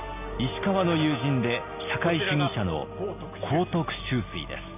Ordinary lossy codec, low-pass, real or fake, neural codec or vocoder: none; 3.6 kHz; real; none